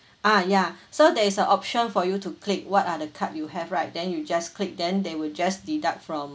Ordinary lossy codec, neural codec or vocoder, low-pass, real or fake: none; none; none; real